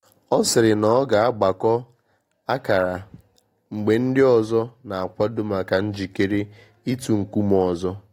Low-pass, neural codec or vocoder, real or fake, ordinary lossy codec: 19.8 kHz; none; real; AAC, 48 kbps